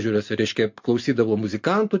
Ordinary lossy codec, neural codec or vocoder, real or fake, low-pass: MP3, 48 kbps; none; real; 7.2 kHz